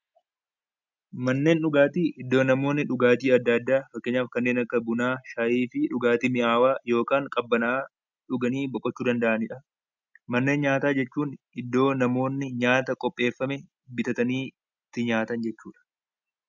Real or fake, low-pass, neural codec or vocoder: real; 7.2 kHz; none